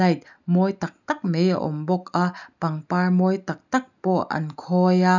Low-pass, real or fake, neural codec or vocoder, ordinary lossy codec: 7.2 kHz; real; none; none